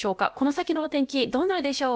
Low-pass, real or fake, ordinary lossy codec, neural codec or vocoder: none; fake; none; codec, 16 kHz, about 1 kbps, DyCAST, with the encoder's durations